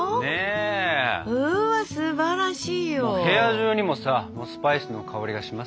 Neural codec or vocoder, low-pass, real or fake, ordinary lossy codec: none; none; real; none